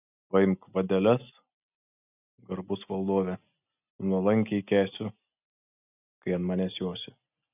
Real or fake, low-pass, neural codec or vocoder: real; 3.6 kHz; none